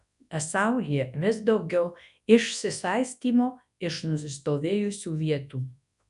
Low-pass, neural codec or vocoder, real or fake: 10.8 kHz; codec, 24 kHz, 0.9 kbps, WavTokenizer, large speech release; fake